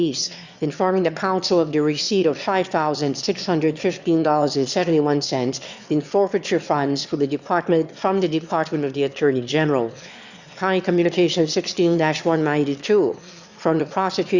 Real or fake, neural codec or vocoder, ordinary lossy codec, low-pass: fake; autoencoder, 22.05 kHz, a latent of 192 numbers a frame, VITS, trained on one speaker; Opus, 64 kbps; 7.2 kHz